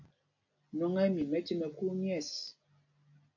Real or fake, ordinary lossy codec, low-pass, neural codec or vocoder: real; AAC, 48 kbps; 7.2 kHz; none